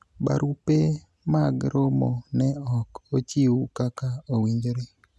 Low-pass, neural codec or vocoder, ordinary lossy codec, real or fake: none; none; none; real